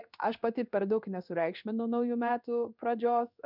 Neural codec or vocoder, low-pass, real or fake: codec, 16 kHz in and 24 kHz out, 1 kbps, XY-Tokenizer; 5.4 kHz; fake